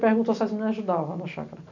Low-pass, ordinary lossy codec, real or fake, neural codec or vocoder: 7.2 kHz; none; real; none